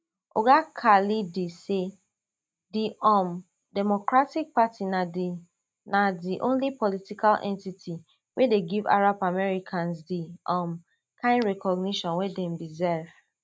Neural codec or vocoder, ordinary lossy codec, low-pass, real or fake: none; none; none; real